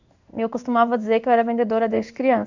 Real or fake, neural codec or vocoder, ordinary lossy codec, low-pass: fake; codec, 16 kHz in and 24 kHz out, 1 kbps, XY-Tokenizer; none; 7.2 kHz